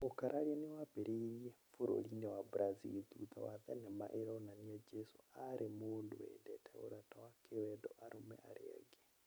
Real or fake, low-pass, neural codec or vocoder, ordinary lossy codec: real; none; none; none